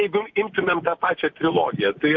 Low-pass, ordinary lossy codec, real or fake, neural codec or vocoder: 7.2 kHz; MP3, 48 kbps; real; none